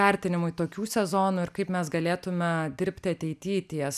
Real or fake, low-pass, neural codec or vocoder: real; 14.4 kHz; none